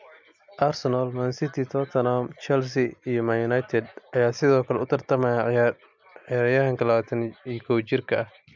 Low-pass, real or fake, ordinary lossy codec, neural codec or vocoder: 7.2 kHz; real; MP3, 64 kbps; none